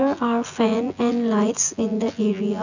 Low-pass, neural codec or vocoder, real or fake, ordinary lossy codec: 7.2 kHz; vocoder, 24 kHz, 100 mel bands, Vocos; fake; none